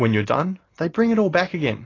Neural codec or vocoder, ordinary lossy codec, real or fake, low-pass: none; AAC, 32 kbps; real; 7.2 kHz